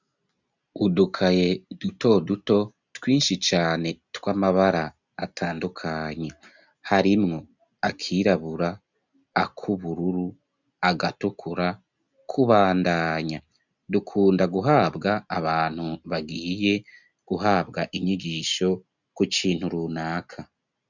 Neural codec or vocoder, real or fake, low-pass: none; real; 7.2 kHz